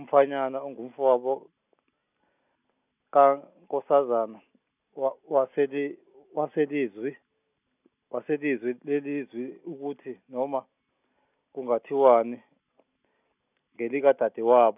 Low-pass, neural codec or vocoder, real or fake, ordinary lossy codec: 3.6 kHz; none; real; none